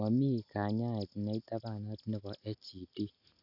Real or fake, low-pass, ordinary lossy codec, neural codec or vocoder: real; 5.4 kHz; none; none